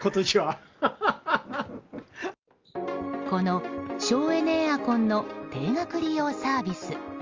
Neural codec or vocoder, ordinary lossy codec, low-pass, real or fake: none; Opus, 32 kbps; 7.2 kHz; real